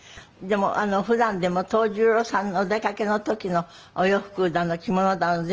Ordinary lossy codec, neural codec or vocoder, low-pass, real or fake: Opus, 24 kbps; none; 7.2 kHz; real